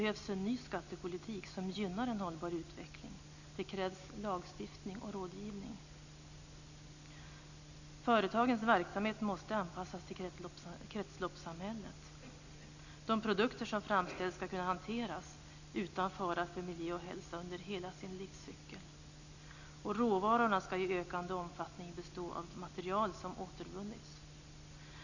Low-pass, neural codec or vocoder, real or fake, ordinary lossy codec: 7.2 kHz; none; real; none